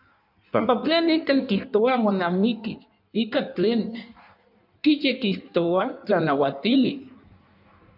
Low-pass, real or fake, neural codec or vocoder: 5.4 kHz; fake; codec, 16 kHz in and 24 kHz out, 1.1 kbps, FireRedTTS-2 codec